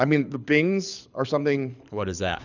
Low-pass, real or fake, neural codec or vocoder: 7.2 kHz; fake; codec, 24 kHz, 6 kbps, HILCodec